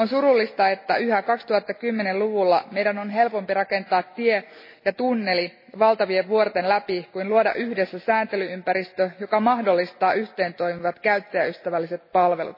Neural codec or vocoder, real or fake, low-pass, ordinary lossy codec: none; real; 5.4 kHz; MP3, 24 kbps